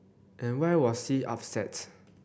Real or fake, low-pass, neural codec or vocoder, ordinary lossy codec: real; none; none; none